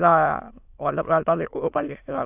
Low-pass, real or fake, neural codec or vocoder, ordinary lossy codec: 3.6 kHz; fake; autoencoder, 22.05 kHz, a latent of 192 numbers a frame, VITS, trained on many speakers; AAC, 32 kbps